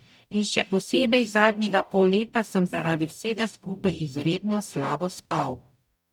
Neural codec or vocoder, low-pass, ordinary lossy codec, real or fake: codec, 44.1 kHz, 0.9 kbps, DAC; 19.8 kHz; none; fake